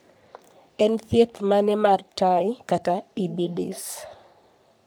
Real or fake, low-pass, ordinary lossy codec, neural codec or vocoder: fake; none; none; codec, 44.1 kHz, 3.4 kbps, Pupu-Codec